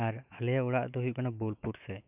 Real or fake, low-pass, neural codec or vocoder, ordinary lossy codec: fake; 3.6 kHz; autoencoder, 48 kHz, 128 numbers a frame, DAC-VAE, trained on Japanese speech; none